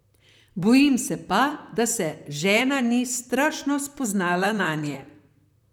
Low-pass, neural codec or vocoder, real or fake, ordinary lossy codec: 19.8 kHz; vocoder, 44.1 kHz, 128 mel bands, Pupu-Vocoder; fake; none